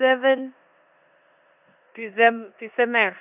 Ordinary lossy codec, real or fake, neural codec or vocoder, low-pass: none; fake; codec, 16 kHz in and 24 kHz out, 0.9 kbps, LongCat-Audio-Codec, four codebook decoder; 3.6 kHz